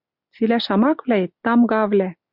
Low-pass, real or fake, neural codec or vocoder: 5.4 kHz; real; none